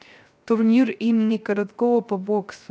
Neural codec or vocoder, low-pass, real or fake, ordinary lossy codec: codec, 16 kHz, 0.3 kbps, FocalCodec; none; fake; none